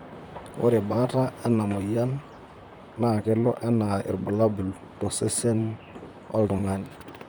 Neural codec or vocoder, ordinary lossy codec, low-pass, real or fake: vocoder, 44.1 kHz, 128 mel bands, Pupu-Vocoder; none; none; fake